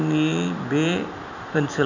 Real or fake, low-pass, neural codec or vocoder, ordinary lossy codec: fake; 7.2 kHz; vocoder, 44.1 kHz, 128 mel bands every 256 samples, BigVGAN v2; none